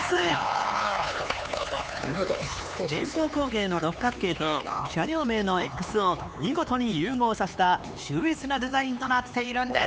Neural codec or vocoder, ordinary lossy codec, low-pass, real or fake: codec, 16 kHz, 4 kbps, X-Codec, HuBERT features, trained on LibriSpeech; none; none; fake